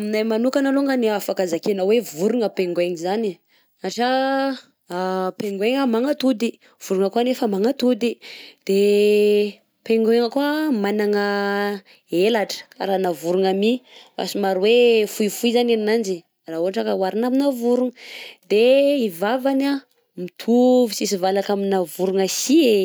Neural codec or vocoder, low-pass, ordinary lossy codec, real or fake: none; none; none; real